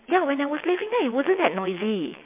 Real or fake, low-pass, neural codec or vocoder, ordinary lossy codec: fake; 3.6 kHz; vocoder, 22.05 kHz, 80 mel bands, WaveNeXt; MP3, 32 kbps